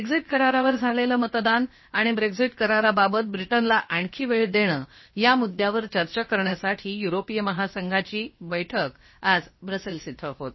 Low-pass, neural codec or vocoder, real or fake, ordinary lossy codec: 7.2 kHz; codec, 16 kHz, about 1 kbps, DyCAST, with the encoder's durations; fake; MP3, 24 kbps